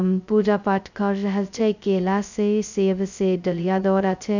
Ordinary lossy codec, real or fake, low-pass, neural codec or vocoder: none; fake; 7.2 kHz; codec, 16 kHz, 0.2 kbps, FocalCodec